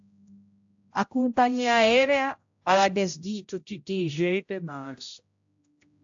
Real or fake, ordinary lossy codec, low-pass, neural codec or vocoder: fake; AAC, 64 kbps; 7.2 kHz; codec, 16 kHz, 0.5 kbps, X-Codec, HuBERT features, trained on general audio